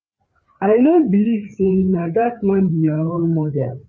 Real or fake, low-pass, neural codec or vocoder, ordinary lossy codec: fake; none; codec, 16 kHz, 4 kbps, FreqCodec, larger model; none